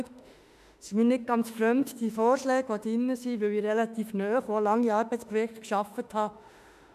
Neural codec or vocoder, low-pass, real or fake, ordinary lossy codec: autoencoder, 48 kHz, 32 numbers a frame, DAC-VAE, trained on Japanese speech; 14.4 kHz; fake; none